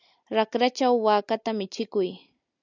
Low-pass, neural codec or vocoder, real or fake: 7.2 kHz; none; real